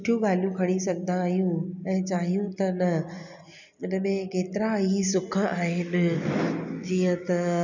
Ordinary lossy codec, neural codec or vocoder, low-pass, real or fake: none; none; 7.2 kHz; real